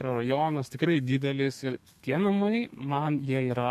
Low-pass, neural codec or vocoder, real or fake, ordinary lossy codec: 14.4 kHz; codec, 32 kHz, 1.9 kbps, SNAC; fake; MP3, 64 kbps